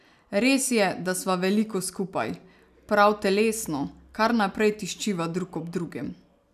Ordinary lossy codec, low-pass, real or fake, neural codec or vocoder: none; 14.4 kHz; real; none